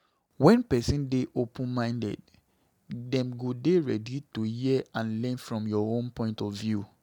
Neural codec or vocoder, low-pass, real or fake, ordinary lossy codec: none; 19.8 kHz; real; none